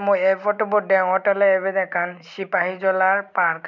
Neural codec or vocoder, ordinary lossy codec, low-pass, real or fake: none; none; 7.2 kHz; real